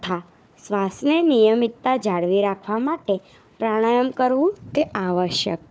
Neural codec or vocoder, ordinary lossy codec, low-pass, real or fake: codec, 16 kHz, 4 kbps, FunCodec, trained on Chinese and English, 50 frames a second; none; none; fake